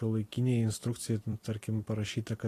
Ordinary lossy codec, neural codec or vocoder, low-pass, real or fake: AAC, 48 kbps; vocoder, 44.1 kHz, 128 mel bands every 256 samples, BigVGAN v2; 14.4 kHz; fake